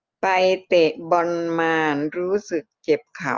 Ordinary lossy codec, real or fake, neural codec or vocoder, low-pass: Opus, 32 kbps; real; none; 7.2 kHz